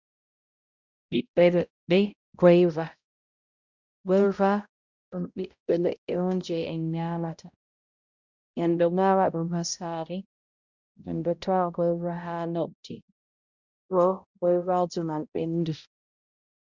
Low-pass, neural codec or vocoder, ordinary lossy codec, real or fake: 7.2 kHz; codec, 16 kHz, 0.5 kbps, X-Codec, HuBERT features, trained on balanced general audio; Opus, 64 kbps; fake